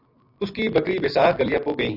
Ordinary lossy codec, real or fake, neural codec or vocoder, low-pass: Opus, 64 kbps; real; none; 5.4 kHz